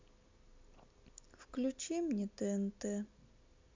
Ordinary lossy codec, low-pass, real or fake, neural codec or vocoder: MP3, 64 kbps; 7.2 kHz; real; none